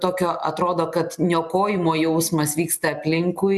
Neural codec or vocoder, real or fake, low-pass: none; real; 14.4 kHz